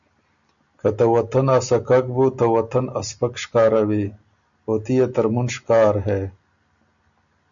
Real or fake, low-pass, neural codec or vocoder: real; 7.2 kHz; none